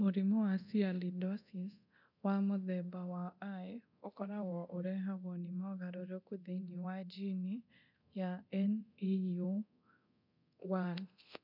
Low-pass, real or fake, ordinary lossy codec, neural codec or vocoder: 5.4 kHz; fake; none; codec, 24 kHz, 0.9 kbps, DualCodec